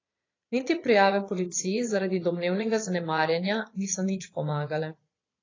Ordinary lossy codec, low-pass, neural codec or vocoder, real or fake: AAC, 32 kbps; 7.2 kHz; vocoder, 22.05 kHz, 80 mel bands, Vocos; fake